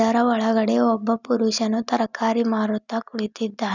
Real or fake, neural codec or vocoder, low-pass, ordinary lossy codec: real; none; 7.2 kHz; none